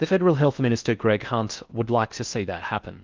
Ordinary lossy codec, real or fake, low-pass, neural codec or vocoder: Opus, 24 kbps; fake; 7.2 kHz; codec, 16 kHz in and 24 kHz out, 0.6 kbps, FocalCodec, streaming, 4096 codes